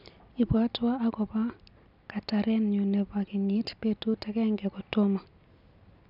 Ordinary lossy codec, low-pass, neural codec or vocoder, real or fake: none; 5.4 kHz; none; real